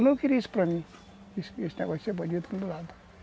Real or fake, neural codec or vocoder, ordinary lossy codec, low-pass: real; none; none; none